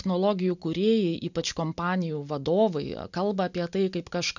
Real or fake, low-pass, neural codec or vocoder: real; 7.2 kHz; none